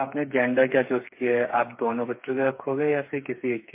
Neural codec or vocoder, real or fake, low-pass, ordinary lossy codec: codec, 16 kHz, 4 kbps, FreqCodec, smaller model; fake; 3.6 kHz; MP3, 24 kbps